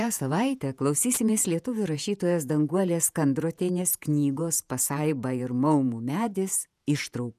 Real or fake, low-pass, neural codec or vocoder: fake; 14.4 kHz; vocoder, 48 kHz, 128 mel bands, Vocos